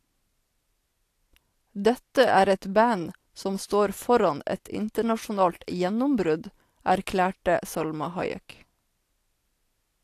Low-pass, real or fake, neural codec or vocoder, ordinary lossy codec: 14.4 kHz; real; none; AAC, 64 kbps